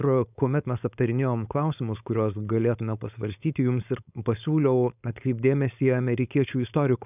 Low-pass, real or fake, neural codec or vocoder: 3.6 kHz; fake; codec, 16 kHz, 4.8 kbps, FACodec